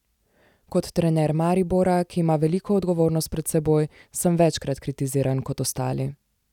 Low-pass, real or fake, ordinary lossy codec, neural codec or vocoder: 19.8 kHz; real; none; none